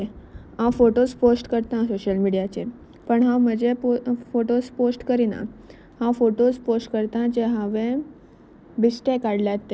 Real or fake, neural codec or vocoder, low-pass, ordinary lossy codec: real; none; none; none